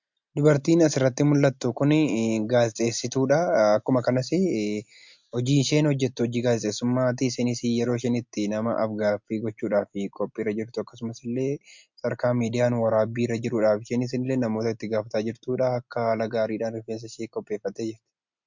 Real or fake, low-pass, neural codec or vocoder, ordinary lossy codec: real; 7.2 kHz; none; MP3, 64 kbps